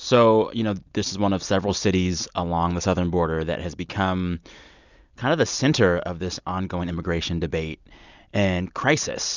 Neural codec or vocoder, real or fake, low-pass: none; real; 7.2 kHz